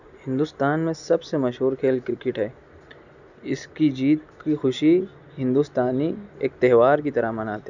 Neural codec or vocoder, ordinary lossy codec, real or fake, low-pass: none; none; real; 7.2 kHz